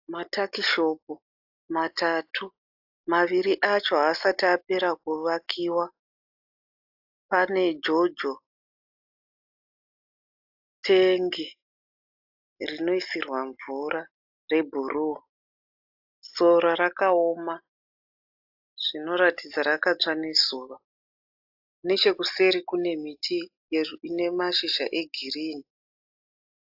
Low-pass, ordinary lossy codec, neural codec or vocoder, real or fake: 5.4 kHz; AAC, 48 kbps; none; real